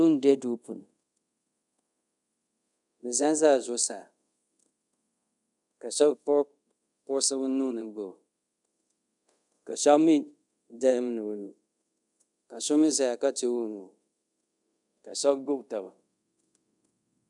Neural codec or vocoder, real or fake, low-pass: codec, 24 kHz, 0.5 kbps, DualCodec; fake; 10.8 kHz